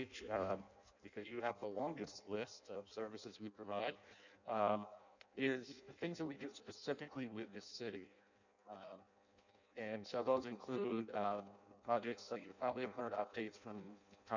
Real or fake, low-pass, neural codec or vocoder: fake; 7.2 kHz; codec, 16 kHz in and 24 kHz out, 0.6 kbps, FireRedTTS-2 codec